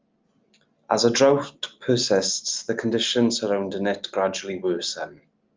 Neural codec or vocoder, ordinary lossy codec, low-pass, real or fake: none; Opus, 32 kbps; 7.2 kHz; real